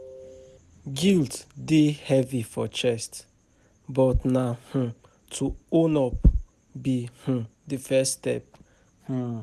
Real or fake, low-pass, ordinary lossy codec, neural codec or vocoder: real; 14.4 kHz; none; none